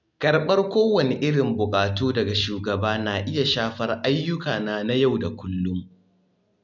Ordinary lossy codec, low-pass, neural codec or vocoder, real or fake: none; 7.2 kHz; none; real